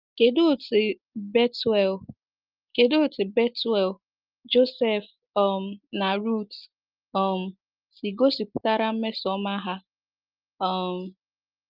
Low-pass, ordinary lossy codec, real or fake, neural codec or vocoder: 5.4 kHz; Opus, 24 kbps; real; none